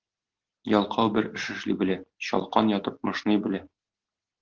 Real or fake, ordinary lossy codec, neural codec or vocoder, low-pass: real; Opus, 16 kbps; none; 7.2 kHz